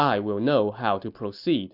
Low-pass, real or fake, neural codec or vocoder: 5.4 kHz; real; none